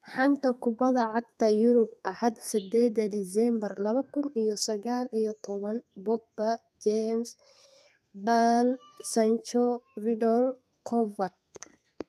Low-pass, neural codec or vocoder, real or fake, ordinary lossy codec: 14.4 kHz; codec, 32 kHz, 1.9 kbps, SNAC; fake; none